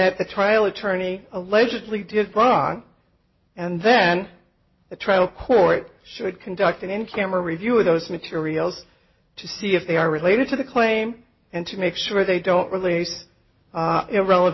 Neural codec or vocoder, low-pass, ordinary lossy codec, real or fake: none; 7.2 kHz; MP3, 24 kbps; real